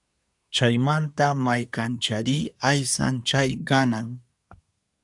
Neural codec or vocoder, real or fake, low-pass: codec, 24 kHz, 1 kbps, SNAC; fake; 10.8 kHz